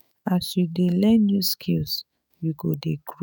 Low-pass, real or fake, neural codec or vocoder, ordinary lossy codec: none; fake; autoencoder, 48 kHz, 128 numbers a frame, DAC-VAE, trained on Japanese speech; none